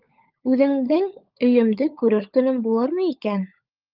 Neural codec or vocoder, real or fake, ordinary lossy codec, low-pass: codec, 16 kHz, 16 kbps, FunCodec, trained on LibriTTS, 50 frames a second; fake; Opus, 24 kbps; 5.4 kHz